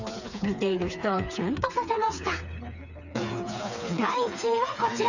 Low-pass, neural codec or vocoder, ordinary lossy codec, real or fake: 7.2 kHz; codec, 16 kHz, 4 kbps, FreqCodec, smaller model; none; fake